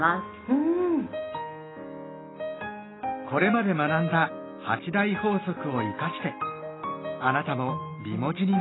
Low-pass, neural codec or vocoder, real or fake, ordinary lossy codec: 7.2 kHz; none; real; AAC, 16 kbps